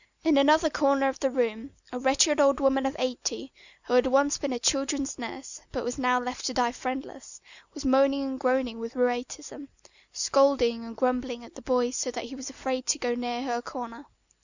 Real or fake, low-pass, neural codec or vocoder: real; 7.2 kHz; none